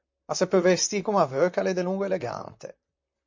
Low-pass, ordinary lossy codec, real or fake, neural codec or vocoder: 7.2 kHz; MP3, 48 kbps; fake; vocoder, 44.1 kHz, 128 mel bands, Pupu-Vocoder